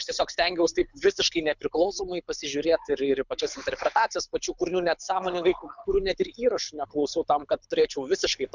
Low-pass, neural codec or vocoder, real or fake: 7.2 kHz; codec, 24 kHz, 6 kbps, HILCodec; fake